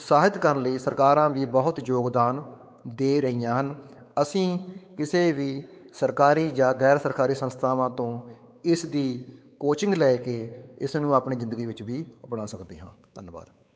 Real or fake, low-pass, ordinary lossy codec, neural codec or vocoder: fake; none; none; codec, 16 kHz, 4 kbps, X-Codec, WavLM features, trained on Multilingual LibriSpeech